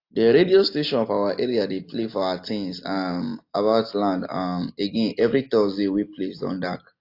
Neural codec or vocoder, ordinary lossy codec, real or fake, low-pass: none; AAC, 32 kbps; real; 5.4 kHz